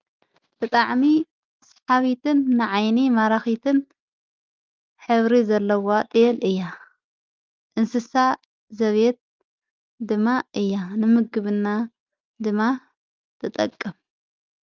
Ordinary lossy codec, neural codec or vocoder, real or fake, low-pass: Opus, 24 kbps; none; real; 7.2 kHz